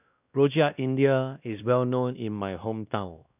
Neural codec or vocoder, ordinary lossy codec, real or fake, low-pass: codec, 16 kHz, 1 kbps, X-Codec, WavLM features, trained on Multilingual LibriSpeech; none; fake; 3.6 kHz